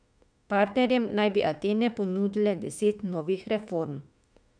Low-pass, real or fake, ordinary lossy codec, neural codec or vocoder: 9.9 kHz; fake; none; autoencoder, 48 kHz, 32 numbers a frame, DAC-VAE, trained on Japanese speech